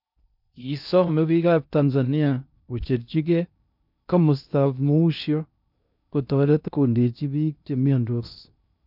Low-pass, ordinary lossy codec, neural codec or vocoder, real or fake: 5.4 kHz; none; codec, 16 kHz in and 24 kHz out, 0.6 kbps, FocalCodec, streaming, 2048 codes; fake